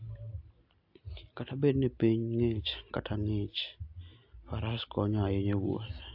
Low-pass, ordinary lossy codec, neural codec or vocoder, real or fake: 5.4 kHz; AAC, 48 kbps; none; real